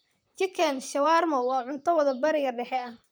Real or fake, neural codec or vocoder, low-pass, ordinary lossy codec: fake; vocoder, 44.1 kHz, 128 mel bands, Pupu-Vocoder; none; none